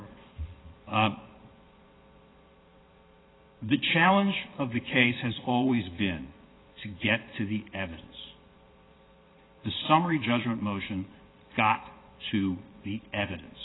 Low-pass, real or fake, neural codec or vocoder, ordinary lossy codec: 7.2 kHz; fake; vocoder, 22.05 kHz, 80 mel bands, Vocos; AAC, 16 kbps